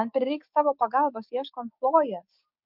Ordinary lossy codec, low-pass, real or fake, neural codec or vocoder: MP3, 48 kbps; 5.4 kHz; fake; codec, 16 kHz, 6 kbps, DAC